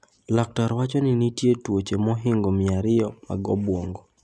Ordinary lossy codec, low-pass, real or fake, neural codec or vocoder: none; none; real; none